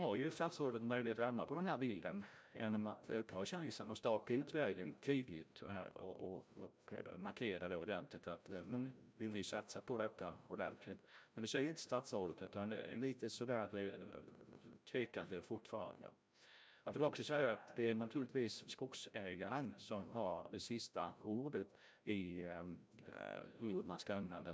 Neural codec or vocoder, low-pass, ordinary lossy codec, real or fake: codec, 16 kHz, 0.5 kbps, FreqCodec, larger model; none; none; fake